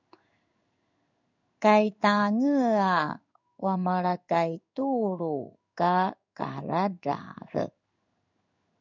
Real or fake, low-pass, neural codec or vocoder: fake; 7.2 kHz; codec, 16 kHz in and 24 kHz out, 1 kbps, XY-Tokenizer